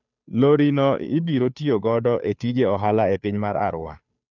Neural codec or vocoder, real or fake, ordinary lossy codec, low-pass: codec, 16 kHz, 2 kbps, FunCodec, trained on Chinese and English, 25 frames a second; fake; none; 7.2 kHz